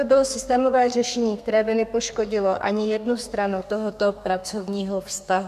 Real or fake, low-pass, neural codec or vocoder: fake; 14.4 kHz; codec, 32 kHz, 1.9 kbps, SNAC